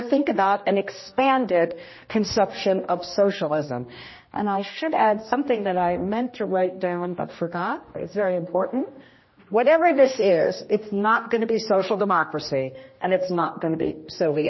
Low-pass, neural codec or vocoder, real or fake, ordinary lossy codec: 7.2 kHz; codec, 16 kHz, 1 kbps, X-Codec, HuBERT features, trained on general audio; fake; MP3, 24 kbps